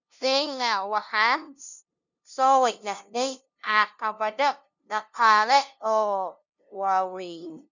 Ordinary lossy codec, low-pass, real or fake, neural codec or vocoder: none; 7.2 kHz; fake; codec, 16 kHz, 0.5 kbps, FunCodec, trained on LibriTTS, 25 frames a second